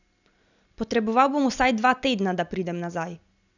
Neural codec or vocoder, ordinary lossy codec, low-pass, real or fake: none; none; 7.2 kHz; real